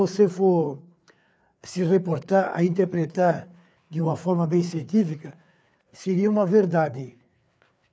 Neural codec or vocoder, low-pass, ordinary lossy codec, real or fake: codec, 16 kHz, 4 kbps, FreqCodec, larger model; none; none; fake